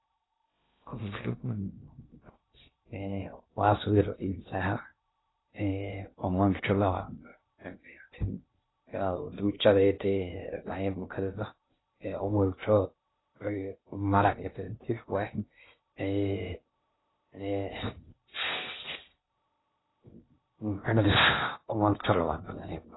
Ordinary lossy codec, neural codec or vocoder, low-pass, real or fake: AAC, 16 kbps; codec, 16 kHz in and 24 kHz out, 0.6 kbps, FocalCodec, streaming, 2048 codes; 7.2 kHz; fake